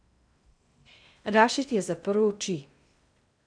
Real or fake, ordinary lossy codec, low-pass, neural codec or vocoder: fake; none; 9.9 kHz; codec, 16 kHz in and 24 kHz out, 0.6 kbps, FocalCodec, streaming, 2048 codes